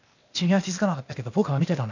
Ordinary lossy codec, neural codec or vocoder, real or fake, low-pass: none; codec, 16 kHz, 0.8 kbps, ZipCodec; fake; 7.2 kHz